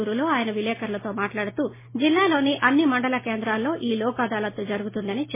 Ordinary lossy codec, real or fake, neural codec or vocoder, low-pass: MP3, 16 kbps; real; none; 3.6 kHz